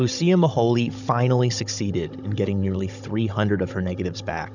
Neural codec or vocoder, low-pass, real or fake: codec, 16 kHz, 16 kbps, FunCodec, trained on Chinese and English, 50 frames a second; 7.2 kHz; fake